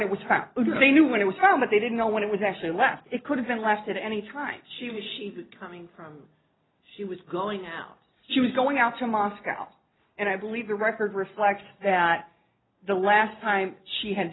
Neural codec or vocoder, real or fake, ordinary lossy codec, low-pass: none; real; AAC, 16 kbps; 7.2 kHz